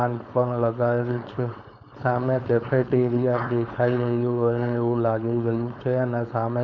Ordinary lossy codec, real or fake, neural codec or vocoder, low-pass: none; fake; codec, 16 kHz, 4.8 kbps, FACodec; 7.2 kHz